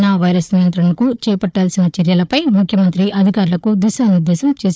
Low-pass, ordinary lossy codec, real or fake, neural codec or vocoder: none; none; fake; codec, 16 kHz, 4 kbps, FunCodec, trained on Chinese and English, 50 frames a second